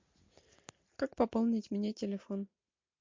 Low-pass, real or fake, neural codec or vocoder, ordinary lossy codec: 7.2 kHz; real; none; MP3, 48 kbps